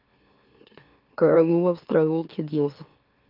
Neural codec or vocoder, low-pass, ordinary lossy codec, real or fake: autoencoder, 44.1 kHz, a latent of 192 numbers a frame, MeloTTS; 5.4 kHz; Opus, 32 kbps; fake